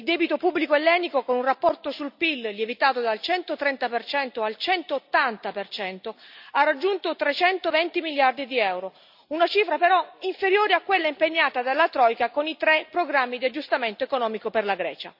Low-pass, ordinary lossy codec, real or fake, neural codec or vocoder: 5.4 kHz; none; real; none